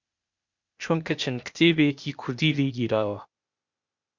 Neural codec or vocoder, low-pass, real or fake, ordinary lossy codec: codec, 16 kHz, 0.8 kbps, ZipCodec; 7.2 kHz; fake; Opus, 64 kbps